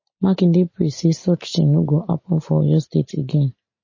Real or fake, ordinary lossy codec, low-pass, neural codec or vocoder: real; MP3, 32 kbps; 7.2 kHz; none